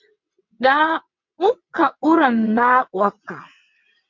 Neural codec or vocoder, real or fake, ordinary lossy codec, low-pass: vocoder, 22.05 kHz, 80 mel bands, WaveNeXt; fake; MP3, 48 kbps; 7.2 kHz